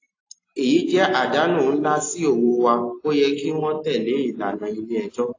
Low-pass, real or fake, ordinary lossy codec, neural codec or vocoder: 7.2 kHz; real; AAC, 32 kbps; none